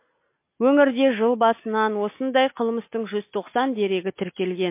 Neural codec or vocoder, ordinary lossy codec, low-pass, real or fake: none; MP3, 24 kbps; 3.6 kHz; real